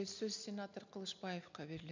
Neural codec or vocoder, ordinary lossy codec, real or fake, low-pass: none; MP3, 64 kbps; real; 7.2 kHz